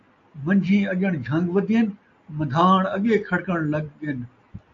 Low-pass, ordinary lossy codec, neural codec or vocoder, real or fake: 7.2 kHz; AAC, 48 kbps; none; real